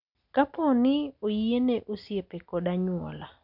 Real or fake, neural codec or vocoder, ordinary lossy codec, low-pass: real; none; none; 5.4 kHz